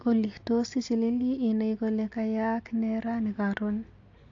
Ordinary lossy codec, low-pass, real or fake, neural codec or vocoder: AAC, 48 kbps; 7.2 kHz; real; none